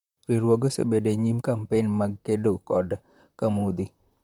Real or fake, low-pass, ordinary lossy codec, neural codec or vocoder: fake; 19.8 kHz; MP3, 96 kbps; vocoder, 44.1 kHz, 128 mel bands, Pupu-Vocoder